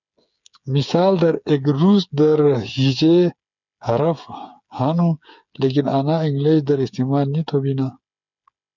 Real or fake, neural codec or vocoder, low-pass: fake; codec, 16 kHz, 8 kbps, FreqCodec, smaller model; 7.2 kHz